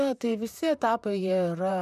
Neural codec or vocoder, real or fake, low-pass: vocoder, 44.1 kHz, 128 mel bands, Pupu-Vocoder; fake; 14.4 kHz